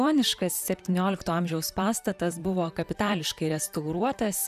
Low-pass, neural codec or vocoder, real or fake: 14.4 kHz; vocoder, 44.1 kHz, 128 mel bands, Pupu-Vocoder; fake